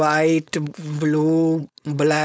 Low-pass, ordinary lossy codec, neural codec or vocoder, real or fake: none; none; codec, 16 kHz, 4.8 kbps, FACodec; fake